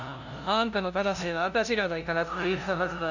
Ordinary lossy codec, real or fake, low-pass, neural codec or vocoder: none; fake; 7.2 kHz; codec, 16 kHz, 0.5 kbps, FunCodec, trained on LibriTTS, 25 frames a second